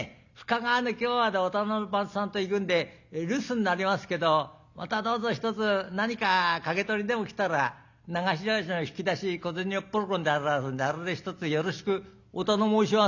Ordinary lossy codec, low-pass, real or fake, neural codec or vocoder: none; 7.2 kHz; real; none